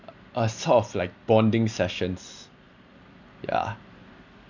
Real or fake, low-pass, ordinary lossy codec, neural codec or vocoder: real; 7.2 kHz; none; none